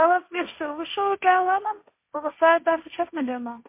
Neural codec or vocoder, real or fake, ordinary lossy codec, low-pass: codec, 16 kHz, 1.1 kbps, Voila-Tokenizer; fake; MP3, 24 kbps; 3.6 kHz